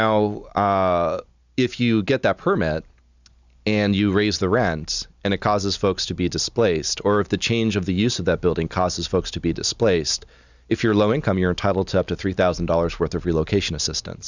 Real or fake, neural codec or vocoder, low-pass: real; none; 7.2 kHz